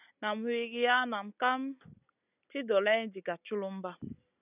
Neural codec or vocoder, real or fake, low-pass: none; real; 3.6 kHz